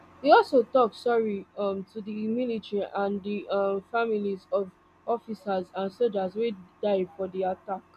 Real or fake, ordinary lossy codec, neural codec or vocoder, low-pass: real; AAC, 96 kbps; none; 14.4 kHz